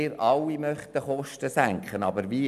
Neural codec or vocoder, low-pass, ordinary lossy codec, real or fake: none; 14.4 kHz; none; real